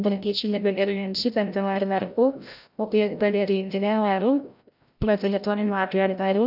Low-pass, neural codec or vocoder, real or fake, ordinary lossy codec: 5.4 kHz; codec, 16 kHz, 0.5 kbps, FreqCodec, larger model; fake; none